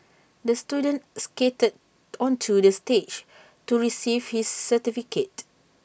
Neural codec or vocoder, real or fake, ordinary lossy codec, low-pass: none; real; none; none